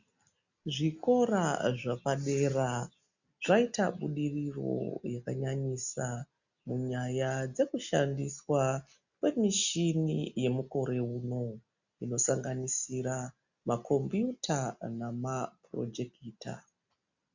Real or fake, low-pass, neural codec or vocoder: real; 7.2 kHz; none